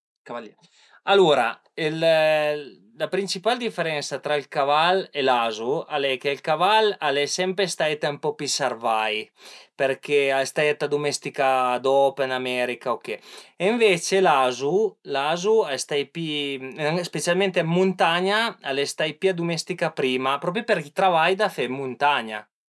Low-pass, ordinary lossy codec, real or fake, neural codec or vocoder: none; none; real; none